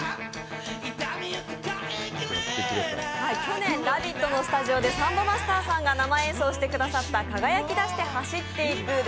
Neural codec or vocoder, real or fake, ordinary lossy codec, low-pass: none; real; none; none